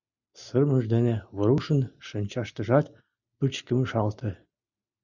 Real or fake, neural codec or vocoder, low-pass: real; none; 7.2 kHz